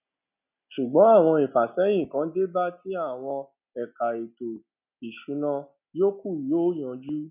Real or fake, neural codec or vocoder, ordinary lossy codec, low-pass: real; none; none; 3.6 kHz